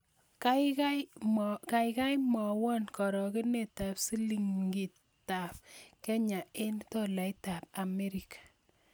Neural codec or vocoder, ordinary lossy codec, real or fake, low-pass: none; none; real; none